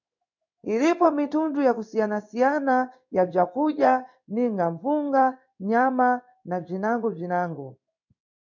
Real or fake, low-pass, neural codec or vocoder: fake; 7.2 kHz; codec, 16 kHz in and 24 kHz out, 1 kbps, XY-Tokenizer